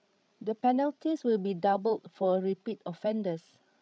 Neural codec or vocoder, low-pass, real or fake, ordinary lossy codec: codec, 16 kHz, 16 kbps, FreqCodec, larger model; none; fake; none